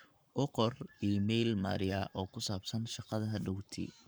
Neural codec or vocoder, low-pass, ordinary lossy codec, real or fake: codec, 44.1 kHz, 7.8 kbps, Pupu-Codec; none; none; fake